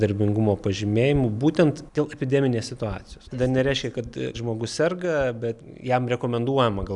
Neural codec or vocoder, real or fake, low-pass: none; real; 10.8 kHz